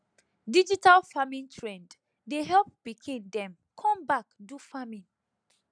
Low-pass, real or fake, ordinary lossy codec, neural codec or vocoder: 9.9 kHz; real; none; none